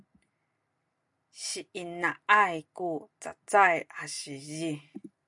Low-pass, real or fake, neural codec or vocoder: 10.8 kHz; real; none